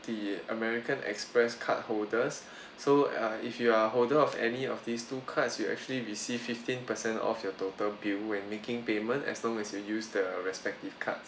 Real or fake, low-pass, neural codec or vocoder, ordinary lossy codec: real; none; none; none